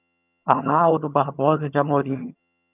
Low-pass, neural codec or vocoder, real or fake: 3.6 kHz; vocoder, 22.05 kHz, 80 mel bands, HiFi-GAN; fake